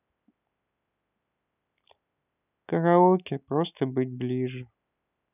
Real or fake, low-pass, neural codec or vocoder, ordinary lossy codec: real; 3.6 kHz; none; none